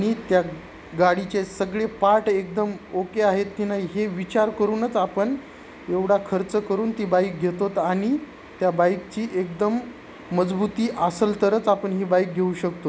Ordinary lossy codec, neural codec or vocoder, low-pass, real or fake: none; none; none; real